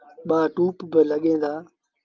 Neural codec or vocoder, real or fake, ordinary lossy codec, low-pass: none; real; Opus, 24 kbps; 7.2 kHz